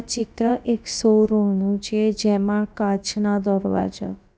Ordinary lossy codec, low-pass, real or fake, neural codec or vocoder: none; none; fake; codec, 16 kHz, about 1 kbps, DyCAST, with the encoder's durations